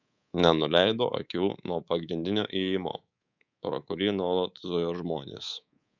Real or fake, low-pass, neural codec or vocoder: fake; 7.2 kHz; codec, 24 kHz, 3.1 kbps, DualCodec